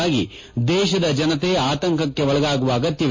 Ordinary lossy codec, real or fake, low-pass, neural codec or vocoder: MP3, 32 kbps; real; 7.2 kHz; none